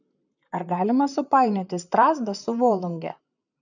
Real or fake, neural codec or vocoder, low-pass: fake; vocoder, 44.1 kHz, 128 mel bands, Pupu-Vocoder; 7.2 kHz